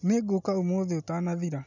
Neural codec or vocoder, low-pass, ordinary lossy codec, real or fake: none; 7.2 kHz; none; real